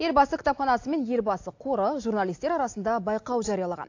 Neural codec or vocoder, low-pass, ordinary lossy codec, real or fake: none; 7.2 kHz; AAC, 48 kbps; real